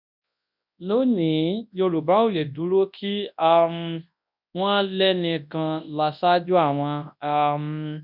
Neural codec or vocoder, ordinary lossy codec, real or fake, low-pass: codec, 24 kHz, 0.9 kbps, WavTokenizer, large speech release; none; fake; 5.4 kHz